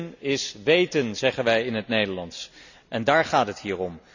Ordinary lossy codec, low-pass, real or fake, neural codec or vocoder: none; 7.2 kHz; real; none